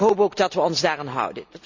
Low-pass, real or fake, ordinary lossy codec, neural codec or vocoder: 7.2 kHz; real; Opus, 64 kbps; none